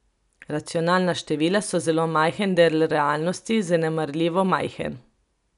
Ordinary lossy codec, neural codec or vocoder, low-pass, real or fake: none; none; 10.8 kHz; real